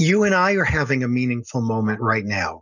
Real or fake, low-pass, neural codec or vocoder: real; 7.2 kHz; none